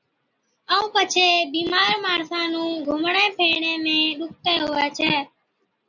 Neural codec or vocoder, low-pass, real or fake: none; 7.2 kHz; real